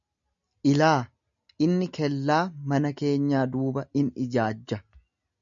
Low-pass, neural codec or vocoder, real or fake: 7.2 kHz; none; real